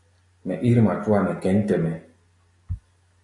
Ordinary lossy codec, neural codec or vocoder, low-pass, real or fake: AAC, 64 kbps; none; 10.8 kHz; real